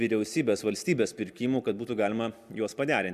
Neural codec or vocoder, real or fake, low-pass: none; real; 14.4 kHz